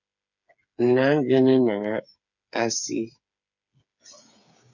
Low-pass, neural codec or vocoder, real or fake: 7.2 kHz; codec, 16 kHz, 8 kbps, FreqCodec, smaller model; fake